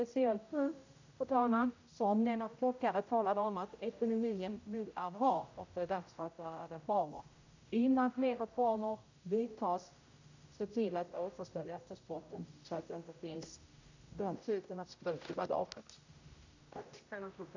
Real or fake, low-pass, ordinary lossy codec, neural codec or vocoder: fake; 7.2 kHz; AAC, 48 kbps; codec, 16 kHz, 0.5 kbps, X-Codec, HuBERT features, trained on general audio